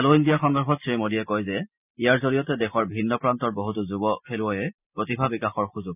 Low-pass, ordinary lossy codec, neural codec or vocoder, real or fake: 3.6 kHz; none; none; real